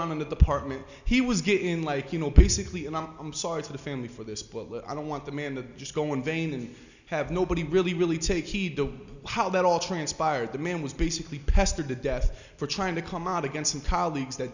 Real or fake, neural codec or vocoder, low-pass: real; none; 7.2 kHz